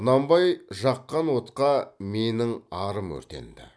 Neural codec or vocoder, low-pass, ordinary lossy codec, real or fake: none; none; none; real